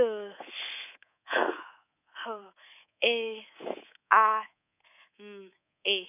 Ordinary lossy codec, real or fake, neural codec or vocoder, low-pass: none; fake; codec, 24 kHz, 3.1 kbps, DualCodec; 3.6 kHz